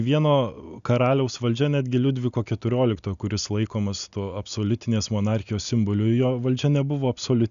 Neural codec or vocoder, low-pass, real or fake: none; 7.2 kHz; real